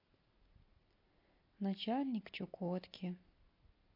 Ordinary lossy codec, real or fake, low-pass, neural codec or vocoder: MP3, 32 kbps; real; 5.4 kHz; none